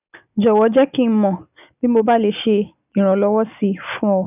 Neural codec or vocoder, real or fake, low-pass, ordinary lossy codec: none; real; 3.6 kHz; none